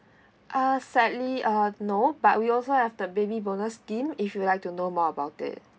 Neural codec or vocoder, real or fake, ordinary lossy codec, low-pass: none; real; none; none